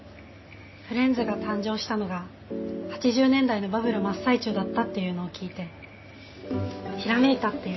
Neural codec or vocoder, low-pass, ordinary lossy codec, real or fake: none; 7.2 kHz; MP3, 24 kbps; real